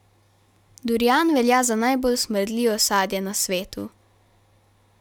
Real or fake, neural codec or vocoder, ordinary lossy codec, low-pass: real; none; none; 19.8 kHz